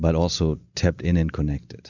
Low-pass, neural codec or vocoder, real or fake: 7.2 kHz; none; real